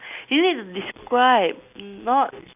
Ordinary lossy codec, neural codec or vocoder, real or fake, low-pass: none; none; real; 3.6 kHz